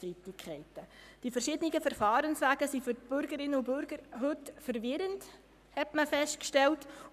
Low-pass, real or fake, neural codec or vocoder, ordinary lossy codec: 14.4 kHz; fake; codec, 44.1 kHz, 7.8 kbps, Pupu-Codec; none